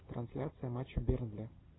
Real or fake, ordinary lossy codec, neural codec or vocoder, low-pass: real; AAC, 16 kbps; none; 7.2 kHz